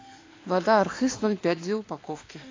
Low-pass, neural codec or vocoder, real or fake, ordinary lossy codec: 7.2 kHz; autoencoder, 48 kHz, 32 numbers a frame, DAC-VAE, trained on Japanese speech; fake; AAC, 32 kbps